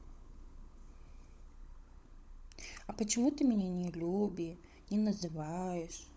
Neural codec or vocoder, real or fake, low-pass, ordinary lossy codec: codec, 16 kHz, 16 kbps, FunCodec, trained on LibriTTS, 50 frames a second; fake; none; none